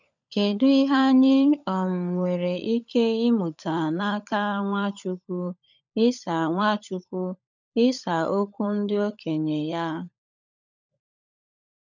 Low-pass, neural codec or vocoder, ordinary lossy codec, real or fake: 7.2 kHz; codec, 16 kHz, 16 kbps, FunCodec, trained on LibriTTS, 50 frames a second; none; fake